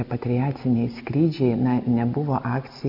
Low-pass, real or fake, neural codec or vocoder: 5.4 kHz; real; none